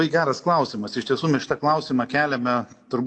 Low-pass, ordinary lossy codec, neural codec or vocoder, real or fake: 9.9 kHz; AAC, 48 kbps; none; real